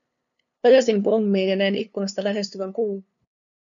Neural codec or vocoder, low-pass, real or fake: codec, 16 kHz, 2 kbps, FunCodec, trained on LibriTTS, 25 frames a second; 7.2 kHz; fake